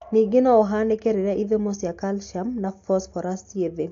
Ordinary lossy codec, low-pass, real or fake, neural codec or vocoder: AAC, 48 kbps; 7.2 kHz; real; none